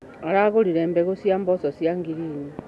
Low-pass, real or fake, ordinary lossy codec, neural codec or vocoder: none; real; none; none